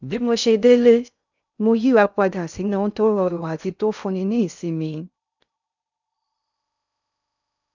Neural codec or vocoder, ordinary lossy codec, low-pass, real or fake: codec, 16 kHz in and 24 kHz out, 0.6 kbps, FocalCodec, streaming, 2048 codes; none; 7.2 kHz; fake